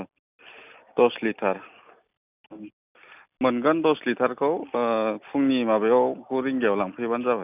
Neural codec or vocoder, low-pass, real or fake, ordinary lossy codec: none; 3.6 kHz; real; none